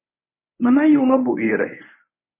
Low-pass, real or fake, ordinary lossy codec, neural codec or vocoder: 3.6 kHz; fake; MP3, 16 kbps; codec, 24 kHz, 0.9 kbps, WavTokenizer, medium speech release version 1